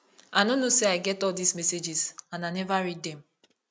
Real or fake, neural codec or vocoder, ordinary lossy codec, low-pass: real; none; none; none